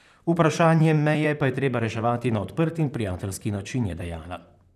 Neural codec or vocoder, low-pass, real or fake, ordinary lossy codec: vocoder, 44.1 kHz, 128 mel bands, Pupu-Vocoder; 14.4 kHz; fake; none